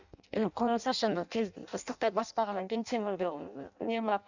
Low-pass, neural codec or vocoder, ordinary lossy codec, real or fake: 7.2 kHz; codec, 16 kHz in and 24 kHz out, 0.6 kbps, FireRedTTS-2 codec; none; fake